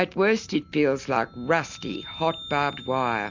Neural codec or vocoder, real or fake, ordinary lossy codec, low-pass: none; real; MP3, 64 kbps; 7.2 kHz